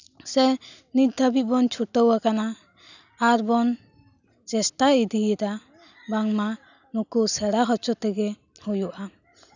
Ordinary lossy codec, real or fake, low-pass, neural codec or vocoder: none; real; 7.2 kHz; none